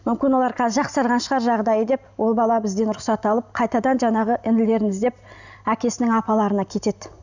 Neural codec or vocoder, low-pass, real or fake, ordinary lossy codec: none; 7.2 kHz; real; none